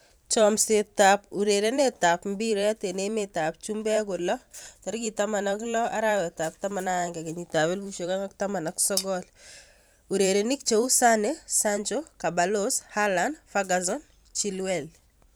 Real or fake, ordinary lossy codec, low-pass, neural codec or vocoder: fake; none; none; vocoder, 44.1 kHz, 128 mel bands every 512 samples, BigVGAN v2